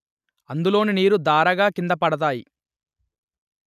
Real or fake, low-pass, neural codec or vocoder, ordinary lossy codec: real; 14.4 kHz; none; none